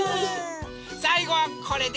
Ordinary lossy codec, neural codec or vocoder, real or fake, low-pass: none; none; real; none